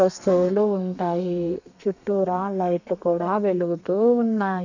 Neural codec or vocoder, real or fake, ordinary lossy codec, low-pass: codec, 32 kHz, 1.9 kbps, SNAC; fake; none; 7.2 kHz